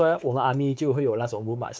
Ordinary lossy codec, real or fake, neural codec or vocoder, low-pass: none; fake; codec, 16 kHz, 4 kbps, X-Codec, WavLM features, trained on Multilingual LibriSpeech; none